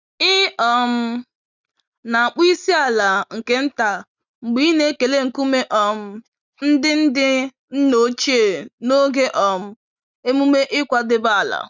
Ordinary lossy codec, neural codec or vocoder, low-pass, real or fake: none; none; 7.2 kHz; real